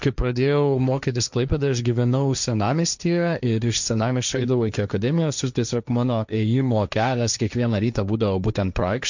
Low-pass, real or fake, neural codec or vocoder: 7.2 kHz; fake; codec, 16 kHz, 1.1 kbps, Voila-Tokenizer